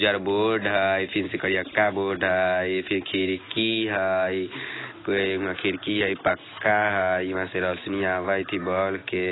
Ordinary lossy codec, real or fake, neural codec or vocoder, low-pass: AAC, 16 kbps; real; none; 7.2 kHz